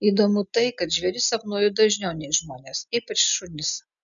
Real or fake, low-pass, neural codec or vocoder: real; 7.2 kHz; none